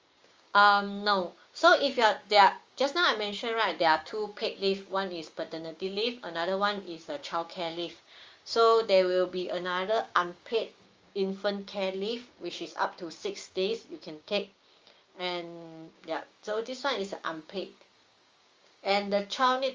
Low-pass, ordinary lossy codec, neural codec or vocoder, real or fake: 7.2 kHz; Opus, 32 kbps; codec, 16 kHz, 6 kbps, DAC; fake